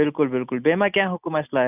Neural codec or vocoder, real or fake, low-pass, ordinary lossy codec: none; real; 3.6 kHz; none